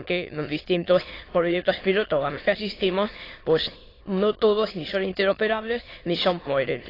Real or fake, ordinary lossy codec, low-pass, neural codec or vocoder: fake; AAC, 24 kbps; 5.4 kHz; autoencoder, 22.05 kHz, a latent of 192 numbers a frame, VITS, trained on many speakers